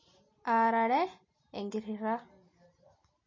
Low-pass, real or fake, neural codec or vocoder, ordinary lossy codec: 7.2 kHz; real; none; MP3, 32 kbps